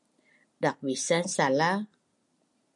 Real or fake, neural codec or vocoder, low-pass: real; none; 10.8 kHz